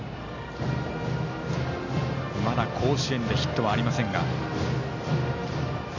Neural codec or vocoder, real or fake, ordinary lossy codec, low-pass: none; real; none; 7.2 kHz